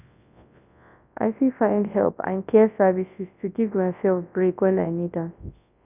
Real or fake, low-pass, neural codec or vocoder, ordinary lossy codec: fake; 3.6 kHz; codec, 24 kHz, 0.9 kbps, WavTokenizer, large speech release; none